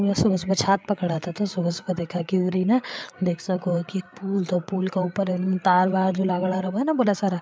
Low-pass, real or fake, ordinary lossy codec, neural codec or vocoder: none; fake; none; codec, 16 kHz, 8 kbps, FreqCodec, larger model